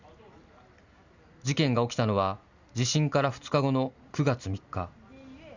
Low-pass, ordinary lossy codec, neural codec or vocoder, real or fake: 7.2 kHz; Opus, 64 kbps; none; real